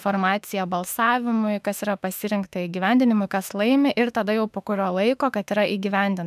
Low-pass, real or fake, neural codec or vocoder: 14.4 kHz; fake; autoencoder, 48 kHz, 32 numbers a frame, DAC-VAE, trained on Japanese speech